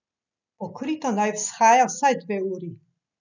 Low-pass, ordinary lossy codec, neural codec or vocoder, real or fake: 7.2 kHz; none; none; real